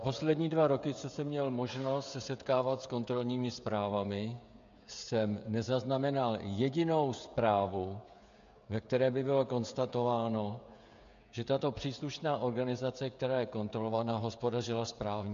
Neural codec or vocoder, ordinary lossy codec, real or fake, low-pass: codec, 16 kHz, 16 kbps, FreqCodec, smaller model; MP3, 64 kbps; fake; 7.2 kHz